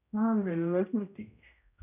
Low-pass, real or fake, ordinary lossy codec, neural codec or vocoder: 3.6 kHz; fake; none; codec, 16 kHz, 0.5 kbps, X-Codec, HuBERT features, trained on general audio